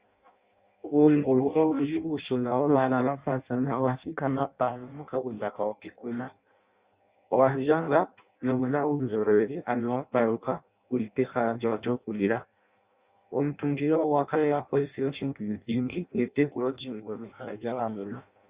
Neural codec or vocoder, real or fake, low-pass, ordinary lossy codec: codec, 16 kHz in and 24 kHz out, 0.6 kbps, FireRedTTS-2 codec; fake; 3.6 kHz; Opus, 64 kbps